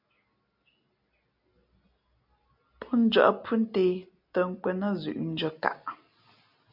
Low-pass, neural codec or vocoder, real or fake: 5.4 kHz; none; real